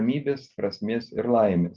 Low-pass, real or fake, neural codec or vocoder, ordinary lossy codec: 7.2 kHz; real; none; Opus, 24 kbps